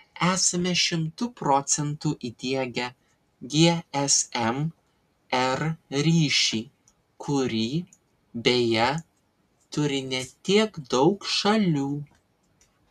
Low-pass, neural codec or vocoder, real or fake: 14.4 kHz; none; real